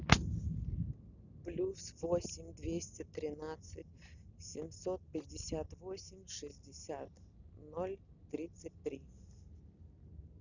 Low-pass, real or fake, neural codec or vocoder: 7.2 kHz; real; none